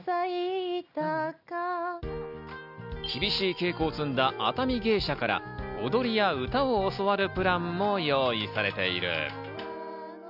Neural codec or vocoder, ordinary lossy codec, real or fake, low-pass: none; none; real; 5.4 kHz